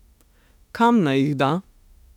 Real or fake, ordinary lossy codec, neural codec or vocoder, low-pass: fake; none; autoencoder, 48 kHz, 32 numbers a frame, DAC-VAE, trained on Japanese speech; 19.8 kHz